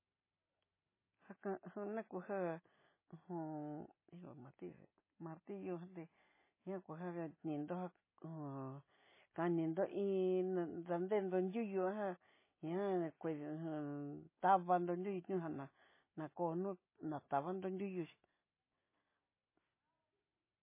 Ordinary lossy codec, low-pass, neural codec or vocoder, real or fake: MP3, 16 kbps; 3.6 kHz; none; real